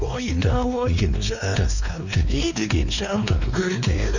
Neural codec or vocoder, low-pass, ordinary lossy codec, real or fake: codec, 16 kHz, 2 kbps, X-Codec, WavLM features, trained on Multilingual LibriSpeech; 7.2 kHz; none; fake